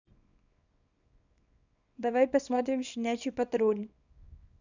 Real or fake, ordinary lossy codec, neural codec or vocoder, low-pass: fake; none; codec, 24 kHz, 0.9 kbps, WavTokenizer, small release; 7.2 kHz